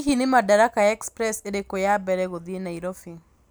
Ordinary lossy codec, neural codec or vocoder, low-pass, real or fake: none; none; none; real